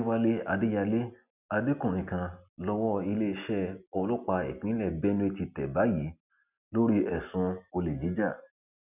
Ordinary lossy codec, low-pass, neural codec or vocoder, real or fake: none; 3.6 kHz; none; real